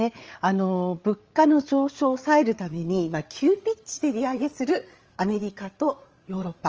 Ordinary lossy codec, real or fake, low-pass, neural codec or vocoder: Opus, 24 kbps; fake; 7.2 kHz; codec, 16 kHz, 8 kbps, FreqCodec, larger model